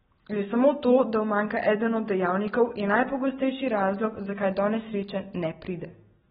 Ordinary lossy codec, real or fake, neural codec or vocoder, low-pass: AAC, 16 kbps; real; none; 19.8 kHz